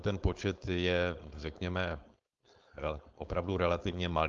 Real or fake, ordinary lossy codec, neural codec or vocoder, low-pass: fake; Opus, 32 kbps; codec, 16 kHz, 4.8 kbps, FACodec; 7.2 kHz